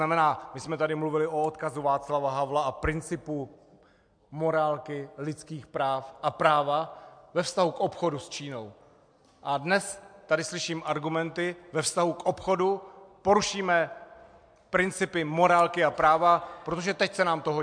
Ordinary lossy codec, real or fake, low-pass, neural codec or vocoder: MP3, 64 kbps; real; 9.9 kHz; none